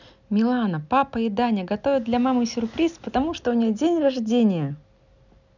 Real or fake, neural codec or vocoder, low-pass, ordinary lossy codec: real; none; 7.2 kHz; none